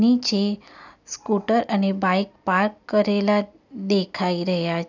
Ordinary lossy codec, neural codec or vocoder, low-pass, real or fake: none; none; 7.2 kHz; real